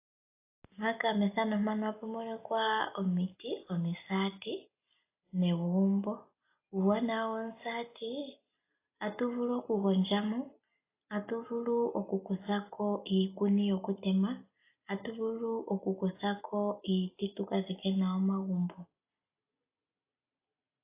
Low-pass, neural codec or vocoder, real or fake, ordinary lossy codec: 3.6 kHz; none; real; AAC, 24 kbps